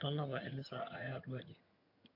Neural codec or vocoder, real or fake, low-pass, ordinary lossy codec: vocoder, 22.05 kHz, 80 mel bands, HiFi-GAN; fake; 5.4 kHz; AAC, 48 kbps